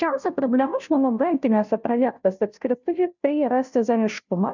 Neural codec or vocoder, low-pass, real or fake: codec, 16 kHz, 0.5 kbps, FunCodec, trained on Chinese and English, 25 frames a second; 7.2 kHz; fake